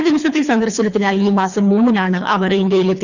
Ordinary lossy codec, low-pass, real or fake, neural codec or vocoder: none; 7.2 kHz; fake; codec, 24 kHz, 3 kbps, HILCodec